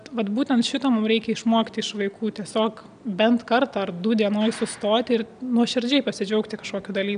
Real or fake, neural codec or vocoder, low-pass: real; none; 9.9 kHz